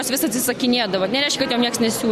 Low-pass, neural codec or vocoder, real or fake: 14.4 kHz; none; real